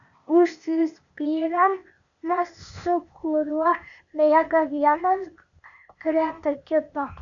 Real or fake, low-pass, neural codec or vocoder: fake; 7.2 kHz; codec, 16 kHz, 0.8 kbps, ZipCodec